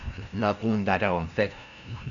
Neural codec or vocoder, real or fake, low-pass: codec, 16 kHz, 0.5 kbps, FunCodec, trained on LibriTTS, 25 frames a second; fake; 7.2 kHz